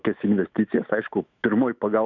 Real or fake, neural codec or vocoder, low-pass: fake; autoencoder, 48 kHz, 128 numbers a frame, DAC-VAE, trained on Japanese speech; 7.2 kHz